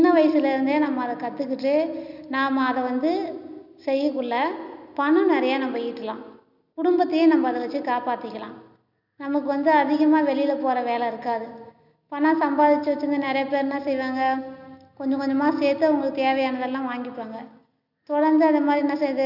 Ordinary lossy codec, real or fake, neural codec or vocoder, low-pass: none; real; none; 5.4 kHz